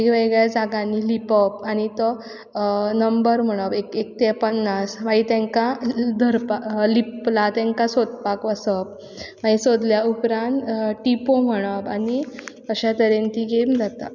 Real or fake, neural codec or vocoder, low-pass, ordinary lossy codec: real; none; 7.2 kHz; none